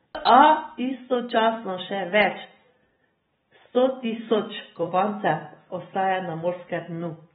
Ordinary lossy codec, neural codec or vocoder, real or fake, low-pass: AAC, 16 kbps; vocoder, 44.1 kHz, 128 mel bands every 256 samples, BigVGAN v2; fake; 19.8 kHz